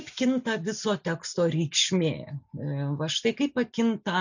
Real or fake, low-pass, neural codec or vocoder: real; 7.2 kHz; none